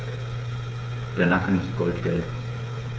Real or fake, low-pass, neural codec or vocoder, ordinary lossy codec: fake; none; codec, 16 kHz, 8 kbps, FreqCodec, smaller model; none